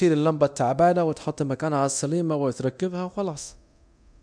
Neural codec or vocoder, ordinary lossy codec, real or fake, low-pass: codec, 24 kHz, 0.9 kbps, DualCodec; none; fake; 9.9 kHz